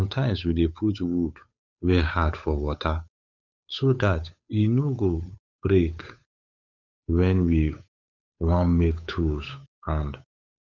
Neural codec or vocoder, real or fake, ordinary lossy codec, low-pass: codec, 16 kHz, 8 kbps, FunCodec, trained on Chinese and English, 25 frames a second; fake; none; 7.2 kHz